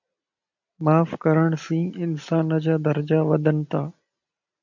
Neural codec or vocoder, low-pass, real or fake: none; 7.2 kHz; real